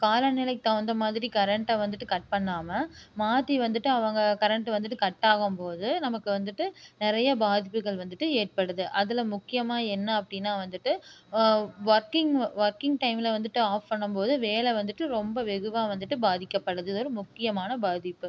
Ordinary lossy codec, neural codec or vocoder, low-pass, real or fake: none; none; none; real